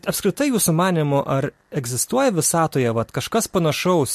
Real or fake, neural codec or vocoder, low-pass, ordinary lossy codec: real; none; 14.4 kHz; MP3, 64 kbps